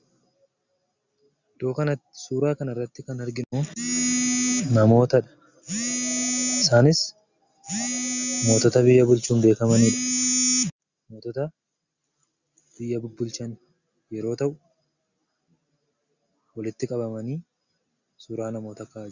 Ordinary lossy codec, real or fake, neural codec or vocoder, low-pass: Opus, 64 kbps; real; none; 7.2 kHz